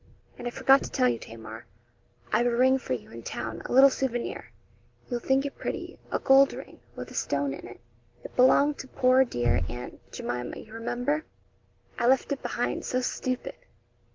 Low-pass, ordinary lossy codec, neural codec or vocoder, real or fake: 7.2 kHz; Opus, 16 kbps; none; real